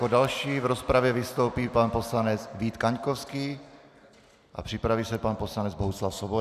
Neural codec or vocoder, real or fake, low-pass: none; real; 14.4 kHz